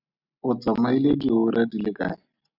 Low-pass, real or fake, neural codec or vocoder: 5.4 kHz; real; none